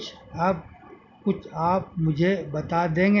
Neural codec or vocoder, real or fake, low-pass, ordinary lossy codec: none; real; 7.2 kHz; none